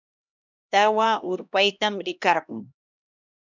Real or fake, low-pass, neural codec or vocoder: fake; 7.2 kHz; codec, 16 kHz, 1 kbps, X-Codec, HuBERT features, trained on LibriSpeech